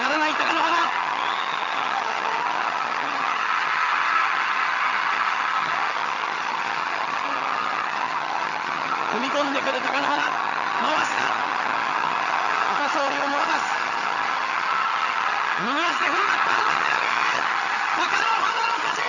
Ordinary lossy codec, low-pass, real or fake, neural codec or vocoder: none; 7.2 kHz; fake; vocoder, 22.05 kHz, 80 mel bands, HiFi-GAN